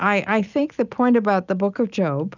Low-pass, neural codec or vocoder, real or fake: 7.2 kHz; none; real